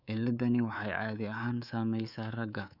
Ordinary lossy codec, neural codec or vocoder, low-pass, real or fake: none; codec, 24 kHz, 3.1 kbps, DualCodec; 5.4 kHz; fake